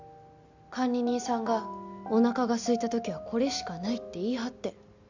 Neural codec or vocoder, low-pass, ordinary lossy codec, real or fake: none; 7.2 kHz; none; real